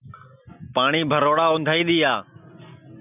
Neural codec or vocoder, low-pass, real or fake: none; 3.6 kHz; real